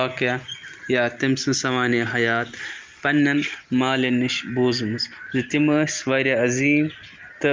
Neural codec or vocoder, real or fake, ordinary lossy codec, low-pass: none; real; Opus, 24 kbps; 7.2 kHz